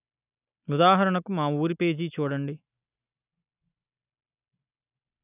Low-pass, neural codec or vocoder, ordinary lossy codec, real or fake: 3.6 kHz; none; none; real